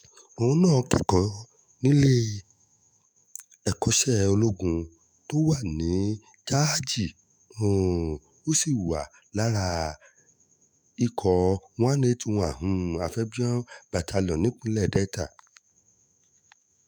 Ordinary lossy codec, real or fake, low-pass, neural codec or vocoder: none; fake; none; autoencoder, 48 kHz, 128 numbers a frame, DAC-VAE, trained on Japanese speech